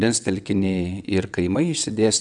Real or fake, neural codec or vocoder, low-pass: fake; vocoder, 22.05 kHz, 80 mel bands, WaveNeXt; 9.9 kHz